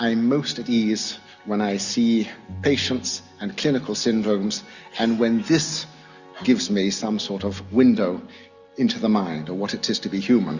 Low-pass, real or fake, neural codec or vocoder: 7.2 kHz; real; none